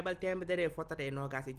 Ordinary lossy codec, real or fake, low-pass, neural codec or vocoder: Opus, 24 kbps; real; 14.4 kHz; none